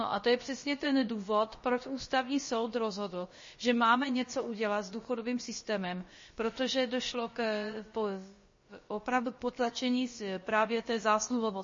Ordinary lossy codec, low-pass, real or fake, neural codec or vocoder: MP3, 32 kbps; 7.2 kHz; fake; codec, 16 kHz, about 1 kbps, DyCAST, with the encoder's durations